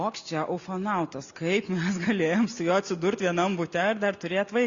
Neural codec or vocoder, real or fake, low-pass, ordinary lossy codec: none; real; 7.2 kHz; Opus, 64 kbps